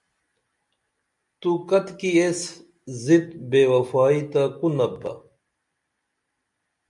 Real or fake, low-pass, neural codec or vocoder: real; 10.8 kHz; none